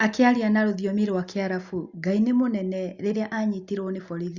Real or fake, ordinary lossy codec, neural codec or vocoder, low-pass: real; Opus, 64 kbps; none; 7.2 kHz